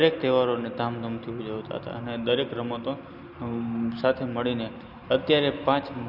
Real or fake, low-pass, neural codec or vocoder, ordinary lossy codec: real; 5.4 kHz; none; none